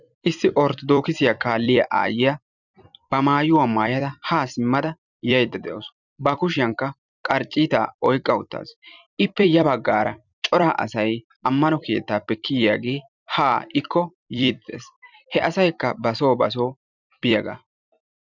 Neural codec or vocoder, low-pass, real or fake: vocoder, 44.1 kHz, 128 mel bands every 256 samples, BigVGAN v2; 7.2 kHz; fake